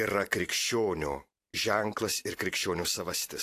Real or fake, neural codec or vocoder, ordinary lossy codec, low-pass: fake; vocoder, 44.1 kHz, 128 mel bands every 512 samples, BigVGAN v2; AAC, 64 kbps; 14.4 kHz